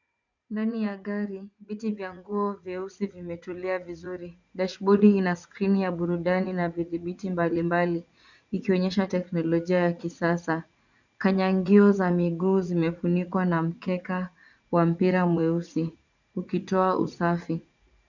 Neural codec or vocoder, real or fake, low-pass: vocoder, 44.1 kHz, 80 mel bands, Vocos; fake; 7.2 kHz